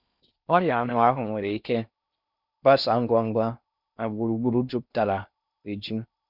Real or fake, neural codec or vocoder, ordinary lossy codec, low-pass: fake; codec, 16 kHz in and 24 kHz out, 0.6 kbps, FocalCodec, streaming, 4096 codes; none; 5.4 kHz